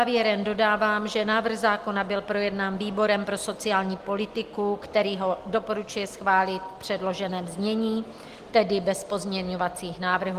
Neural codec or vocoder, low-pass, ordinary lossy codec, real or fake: none; 14.4 kHz; Opus, 24 kbps; real